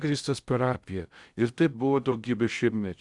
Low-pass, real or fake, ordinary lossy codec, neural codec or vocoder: 10.8 kHz; fake; Opus, 64 kbps; codec, 16 kHz in and 24 kHz out, 0.6 kbps, FocalCodec, streaming, 2048 codes